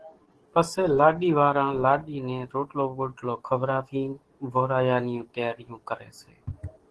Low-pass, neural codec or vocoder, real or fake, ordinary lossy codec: 10.8 kHz; codec, 44.1 kHz, 7.8 kbps, DAC; fake; Opus, 16 kbps